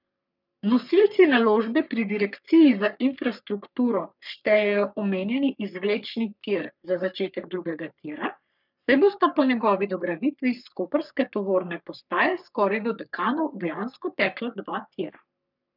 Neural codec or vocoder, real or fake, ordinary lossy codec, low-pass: codec, 44.1 kHz, 3.4 kbps, Pupu-Codec; fake; none; 5.4 kHz